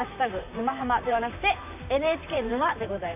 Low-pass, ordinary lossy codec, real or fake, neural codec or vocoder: 3.6 kHz; AAC, 32 kbps; fake; vocoder, 44.1 kHz, 128 mel bands, Pupu-Vocoder